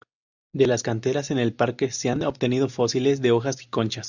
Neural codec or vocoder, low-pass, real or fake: none; 7.2 kHz; real